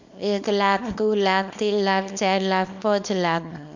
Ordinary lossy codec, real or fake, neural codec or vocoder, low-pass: MP3, 64 kbps; fake; codec, 24 kHz, 0.9 kbps, WavTokenizer, small release; 7.2 kHz